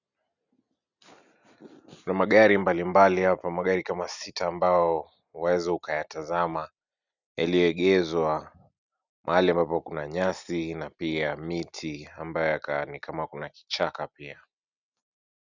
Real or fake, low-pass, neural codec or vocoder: real; 7.2 kHz; none